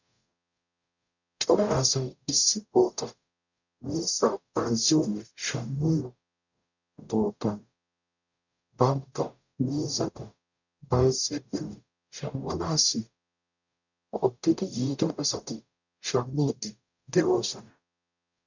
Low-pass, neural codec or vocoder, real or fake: 7.2 kHz; codec, 44.1 kHz, 0.9 kbps, DAC; fake